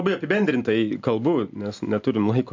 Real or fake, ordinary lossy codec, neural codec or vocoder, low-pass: real; MP3, 64 kbps; none; 7.2 kHz